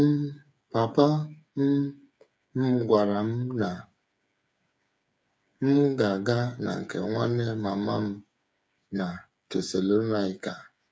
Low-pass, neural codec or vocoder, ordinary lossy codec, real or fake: none; codec, 16 kHz, 8 kbps, FreqCodec, smaller model; none; fake